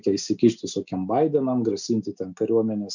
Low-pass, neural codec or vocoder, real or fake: 7.2 kHz; none; real